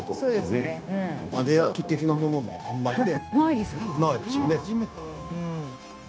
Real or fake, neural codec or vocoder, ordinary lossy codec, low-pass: fake; codec, 16 kHz, 0.9 kbps, LongCat-Audio-Codec; none; none